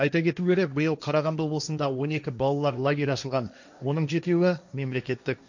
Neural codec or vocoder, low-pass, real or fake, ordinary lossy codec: codec, 16 kHz, 1.1 kbps, Voila-Tokenizer; 7.2 kHz; fake; none